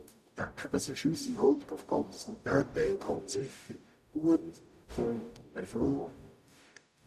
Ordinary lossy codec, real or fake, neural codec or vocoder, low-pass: none; fake; codec, 44.1 kHz, 0.9 kbps, DAC; 14.4 kHz